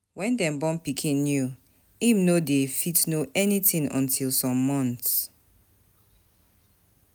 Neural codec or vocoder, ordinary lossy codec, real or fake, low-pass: none; none; real; none